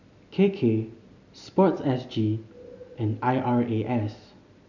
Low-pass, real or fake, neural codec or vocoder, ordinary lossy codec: 7.2 kHz; real; none; none